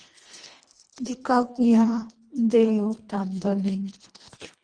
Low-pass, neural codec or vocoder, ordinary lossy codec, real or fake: 9.9 kHz; codec, 24 kHz, 1.5 kbps, HILCodec; Opus, 24 kbps; fake